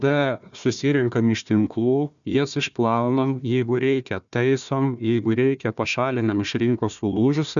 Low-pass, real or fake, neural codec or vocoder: 7.2 kHz; fake; codec, 16 kHz, 1 kbps, FunCodec, trained on Chinese and English, 50 frames a second